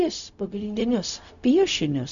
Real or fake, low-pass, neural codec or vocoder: fake; 7.2 kHz; codec, 16 kHz, 0.4 kbps, LongCat-Audio-Codec